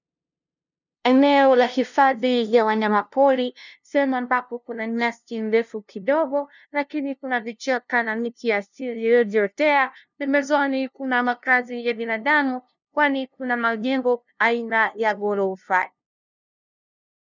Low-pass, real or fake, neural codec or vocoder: 7.2 kHz; fake; codec, 16 kHz, 0.5 kbps, FunCodec, trained on LibriTTS, 25 frames a second